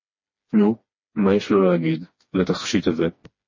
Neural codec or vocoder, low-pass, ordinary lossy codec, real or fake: codec, 16 kHz, 2 kbps, FreqCodec, smaller model; 7.2 kHz; MP3, 32 kbps; fake